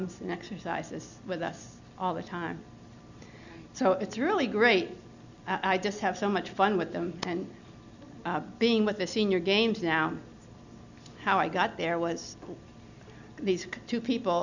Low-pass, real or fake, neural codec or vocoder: 7.2 kHz; real; none